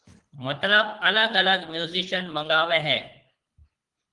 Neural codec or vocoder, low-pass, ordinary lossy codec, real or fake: codec, 24 kHz, 3 kbps, HILCodec; 10.8 kHz; Opus, 24 kbps; fake